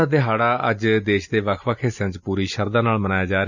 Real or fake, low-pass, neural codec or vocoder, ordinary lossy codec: real; 7.2 kHz; none; none